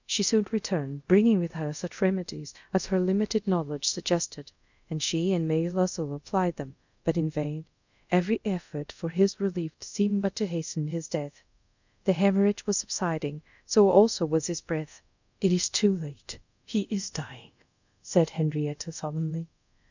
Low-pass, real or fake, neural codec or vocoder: 7.2 kHz; fake; codec, 24 kHz, 0.5 kbps, DualCodec